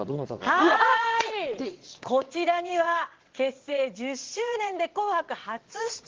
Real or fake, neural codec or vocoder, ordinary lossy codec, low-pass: fake; vocoder, 22.05 kHz, 80 mel bands, WaveNeXt; Opus, 16 kbps; 7.2 kHz